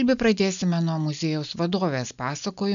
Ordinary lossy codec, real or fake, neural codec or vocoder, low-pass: AAC, 96 kbps; real; none; 7.2 kHz